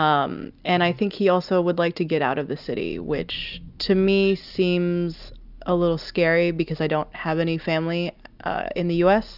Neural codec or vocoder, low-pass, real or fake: none; 5.4 kHz; real